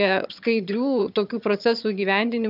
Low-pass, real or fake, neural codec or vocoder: 5.4 kHz; fake; vocoder, 22.05 kHz, 80 mel bands, HiFi-GAN